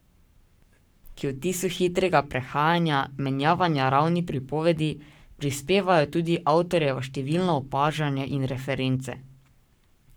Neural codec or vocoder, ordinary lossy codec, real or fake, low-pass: codec, 44.1 kHz, 7.8 kbps, Pupu-Codec; none; fake; none